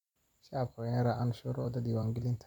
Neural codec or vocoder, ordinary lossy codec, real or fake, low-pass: vocoder, 44.1 kHz, 128 mel bands every 256 samples, BigVGAN v2; MP3, 96 kbps; fake; 19.8 kHz